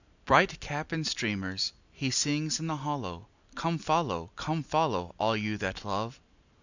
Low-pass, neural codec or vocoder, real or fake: 7.2 kHz; none; real